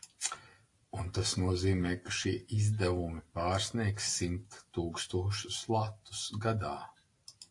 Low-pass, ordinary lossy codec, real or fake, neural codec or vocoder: 10.8 kHz; AAC, 48 kbps; real; none